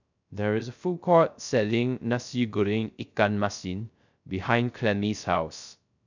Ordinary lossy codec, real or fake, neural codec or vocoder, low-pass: none; fake; codec, 16 kHz, 0.3 kbps, FocalCodec; 7.2 kHz